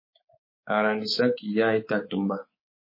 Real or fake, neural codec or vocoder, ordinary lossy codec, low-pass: fake; codec, 24 kHz, 3.1 kbps, DualCodec; MP3, 24 kbps; 5.4 kHz